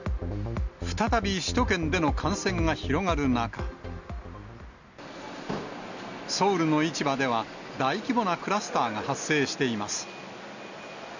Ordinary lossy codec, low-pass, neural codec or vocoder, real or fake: none; 7.2 kHz; none; real